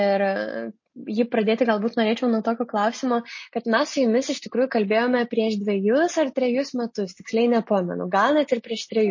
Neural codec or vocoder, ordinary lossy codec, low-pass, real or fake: none; MP3, 32 kbps; 7.2 kHz; real